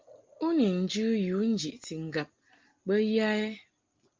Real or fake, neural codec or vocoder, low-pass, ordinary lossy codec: real; none; 7.2 kHz; Opus, 32 kbps